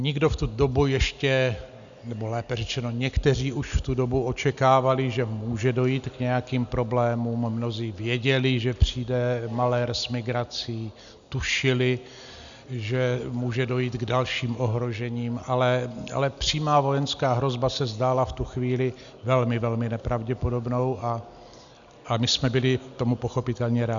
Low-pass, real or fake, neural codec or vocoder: 7.2 kHz; real; none